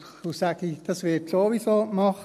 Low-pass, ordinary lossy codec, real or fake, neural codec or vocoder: 14.4 kHz; none; real; none